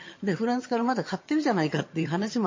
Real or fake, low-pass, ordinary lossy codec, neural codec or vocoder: fake; 7.2 kHz; MP3, 32 kbps; vocoder, 22.05 kHz, 80 mel bands, HiFi-GAN